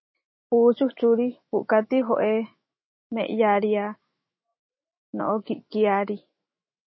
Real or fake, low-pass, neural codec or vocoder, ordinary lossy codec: fake; 7.2 kHz; autoencoder, 48 kHz, 128 numbers a frame, DAC-VAE, trained on Japanese speech; MP3, 24 kbps